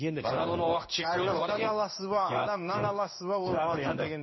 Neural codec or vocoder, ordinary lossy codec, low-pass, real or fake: codec, 16 kHz in and 24 kHz out, 1 kbps, XY-Tokenizer; MP3, 24 kbps; 7.2 kHz; fake